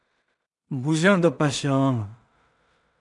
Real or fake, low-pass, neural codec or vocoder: fake; 10.8 kHz; codec, 16 kHz in and 24 kHz out, 0.4 kbps, LongCat-Audio-Codec, two codebook decoder